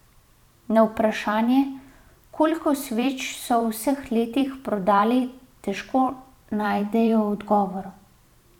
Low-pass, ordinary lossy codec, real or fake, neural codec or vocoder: 19.8 kHz; none; fake; vocoder, 44.1 kHz, 128 mel bands every 512 samples, BigVGAN v2